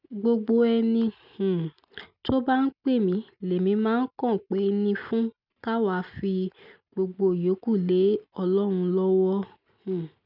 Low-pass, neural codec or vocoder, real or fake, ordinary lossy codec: 5.4 kHz; none; real; none